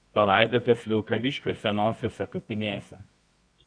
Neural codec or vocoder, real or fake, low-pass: codec, 24 kHz, 0.9 kbps, WavTokenizer, medium music audio release; fake; 9.9 kHz